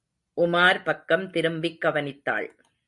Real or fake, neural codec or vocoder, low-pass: real; none; 10.8 kHz